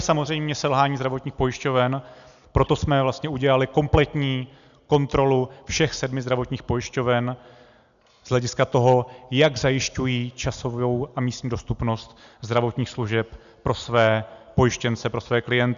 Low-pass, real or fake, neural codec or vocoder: 7.2 kHz; real; none